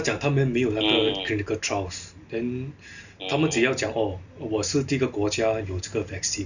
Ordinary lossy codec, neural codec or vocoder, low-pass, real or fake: none; none; 7.2 kHz; real